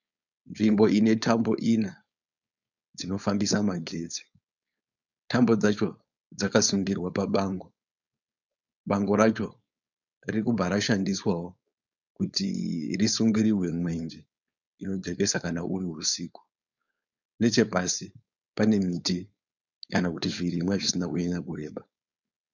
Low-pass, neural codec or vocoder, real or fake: 7.2 kHz; codec, 16 kHz, 4.8 kbps, FACodec; fake